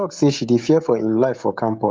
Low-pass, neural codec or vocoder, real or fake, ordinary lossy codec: 7.2 kHz; codec, 16 kHz, 16 kbps, FreqCodec, smaller model; fake; Opus, 32 kbps